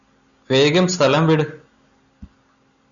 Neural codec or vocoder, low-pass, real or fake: none; 7.2 kHz; real